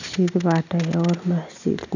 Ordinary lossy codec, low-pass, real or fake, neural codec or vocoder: none; 7.2 kHz; real; none